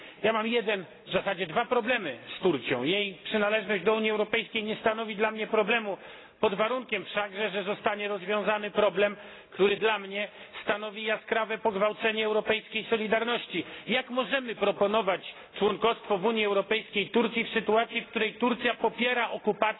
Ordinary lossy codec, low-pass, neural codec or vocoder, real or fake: AAC, 16 kbps; 7.2 kHz; none; real